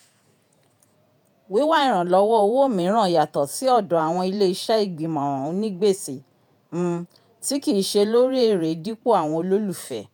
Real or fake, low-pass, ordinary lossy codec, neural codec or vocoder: fake; none; none; vocoder, 48 kHz, 128 mel bands, Vocos